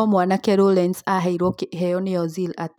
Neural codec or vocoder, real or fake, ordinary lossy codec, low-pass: none; real; none; 19.8 kHz